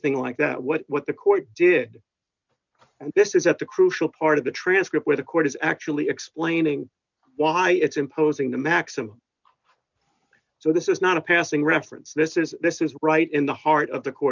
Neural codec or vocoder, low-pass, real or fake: none; 7.2 kHz; real